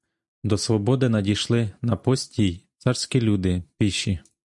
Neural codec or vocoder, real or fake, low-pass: none; real; 10.8 kHz